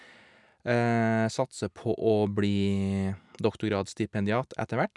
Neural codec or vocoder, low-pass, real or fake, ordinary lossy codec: none; 10.8 kHz; real; none